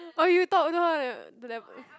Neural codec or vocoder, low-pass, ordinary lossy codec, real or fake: none; none; none; real